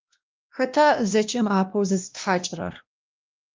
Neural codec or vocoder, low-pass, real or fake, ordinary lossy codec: codec, 16 kHz, 1 kbps, X-Codec, WavLM features, trained on Multilingual LibriSpeech; 7.2 kHz; fake; Opus, 24 kbps